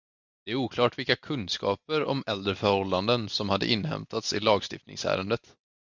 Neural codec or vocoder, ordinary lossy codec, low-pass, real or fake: none; Opus, 64 kbps; 7.2 kHz; real